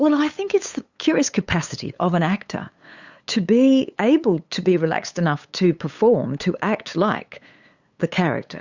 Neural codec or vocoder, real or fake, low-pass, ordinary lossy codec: codec, 16 kHz, 8 kbps, FunCodec, trained on LibriTTS, 25 frames a second; fake; 7.2 kHz; Opus, 64 kbps